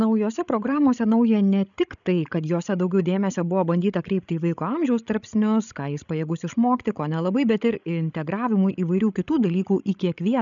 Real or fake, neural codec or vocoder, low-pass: fake; codec, 16 kHz, 16 kbps, FreqCodec, larger model; 7.2 kHz